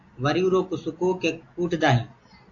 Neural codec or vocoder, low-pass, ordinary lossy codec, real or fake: none; 7.2 kHz; AAC, 48 kbps; real